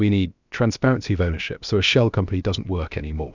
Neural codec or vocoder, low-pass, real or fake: codec, 16 kHz, about 1 kbps, DyCAST, with the encoder's durations; 7.2 kHz; fake